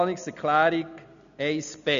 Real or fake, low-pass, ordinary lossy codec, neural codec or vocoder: real; 7.2 kHz; none; none